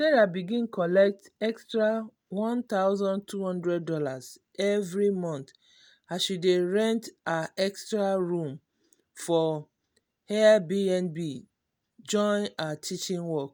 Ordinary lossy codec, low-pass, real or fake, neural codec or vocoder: none; none; real; none